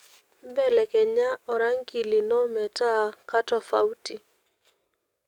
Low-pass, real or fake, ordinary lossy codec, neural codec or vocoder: 19.8 kHz; fake; none; codec, 44.1 kHz, 7.8 kbps, DAC